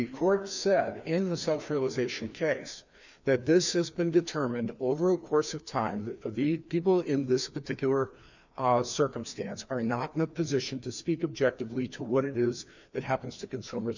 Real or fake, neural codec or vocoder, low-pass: fake; codec, 16 kHz, 2 kbps, FreqCodec, larger model; 7.2 kHz